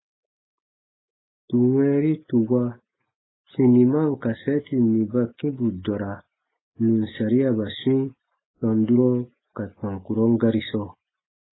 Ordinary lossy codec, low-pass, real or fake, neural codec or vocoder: AAC, 16 kbps; 7.2 kHz; real; none